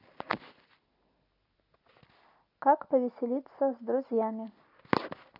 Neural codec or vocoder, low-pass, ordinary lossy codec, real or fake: none; 5.4 kHz; AAC, 32 kbps; real